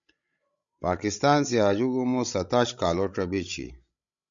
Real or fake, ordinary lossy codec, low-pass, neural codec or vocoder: fake; MP3, 64 kbps; 7.2 kHz; codec, 16 kHz, 16 kbps, FreqCodec, larger model